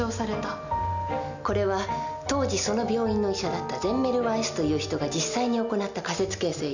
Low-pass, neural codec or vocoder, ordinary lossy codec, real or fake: 7.2 kHz; none; none; real